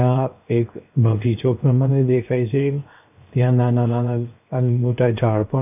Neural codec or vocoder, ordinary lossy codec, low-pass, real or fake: codec, 16 kHz, 0.7 kbps, FocalCodec; none; 3.6 kHz; fake